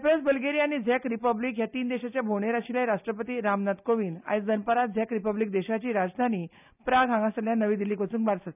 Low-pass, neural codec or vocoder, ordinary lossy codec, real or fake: 3.6 kHz; none; none; real